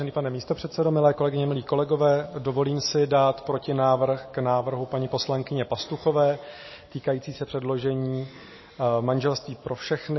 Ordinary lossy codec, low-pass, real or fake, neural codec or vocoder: MP3, 24 kbps; 7.2 kHz; real; none